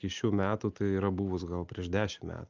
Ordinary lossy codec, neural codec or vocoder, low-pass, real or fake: Opus, 32 kbps; none; 7.2 kHz; real